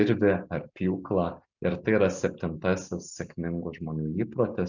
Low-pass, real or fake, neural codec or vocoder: 7.2 kHz; real; none